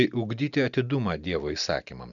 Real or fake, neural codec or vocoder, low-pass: real; none; 7.2 kHz